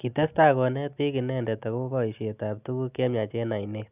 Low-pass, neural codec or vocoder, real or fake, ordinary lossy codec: 3.6 kHz; none; real; none